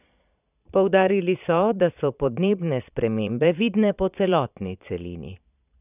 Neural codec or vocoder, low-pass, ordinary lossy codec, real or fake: vocoder, 22.05 kHz, 80 mel bands, Vocos; 3.6 kHz; none; fake